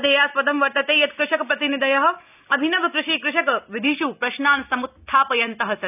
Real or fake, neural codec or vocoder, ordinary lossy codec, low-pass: real; none; none; 3.6 kHz